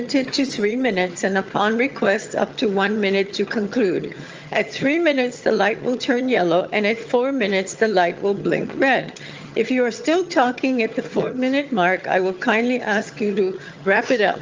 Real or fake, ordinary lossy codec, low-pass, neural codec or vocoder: fake; Opus, 24 kbps; 7.2 kHz; vocoder, 22.05 kHz, 80 mel bands, HiFi-GAN